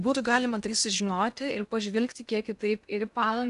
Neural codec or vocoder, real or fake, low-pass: codec, 16 kHz in and 24 kHz out, 0.6 kbps, FocalCodec, streaming, 2048 codes; fake; 10.8 kHz